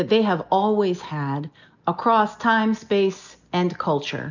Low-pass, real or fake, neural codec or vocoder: 7.2 kHz; real; none